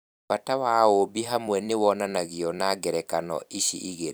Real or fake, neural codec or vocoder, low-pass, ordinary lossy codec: fake; vocoder, 44.1 kHz, 128 mel bands every 256 samples, BigVGAN v2; none; none